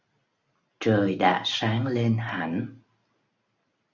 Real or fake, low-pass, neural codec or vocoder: real; 7.2 kHz; none